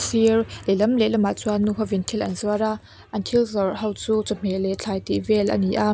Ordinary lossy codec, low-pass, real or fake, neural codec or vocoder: none; none; real; none